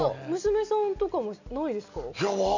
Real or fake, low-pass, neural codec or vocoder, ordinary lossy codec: real; 7.2 kHz; none; none